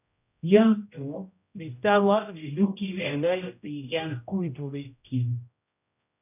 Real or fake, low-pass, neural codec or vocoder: fake; 3.6 kHz; codec, 16 kHz, 0.5 kbps, X-Codec, HuBERT features, trained on general audio